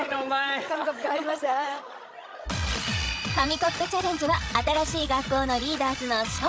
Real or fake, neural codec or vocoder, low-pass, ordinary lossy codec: fake; codec, 16 kHz, 16 kbps, FreqCodec, larger model; none; none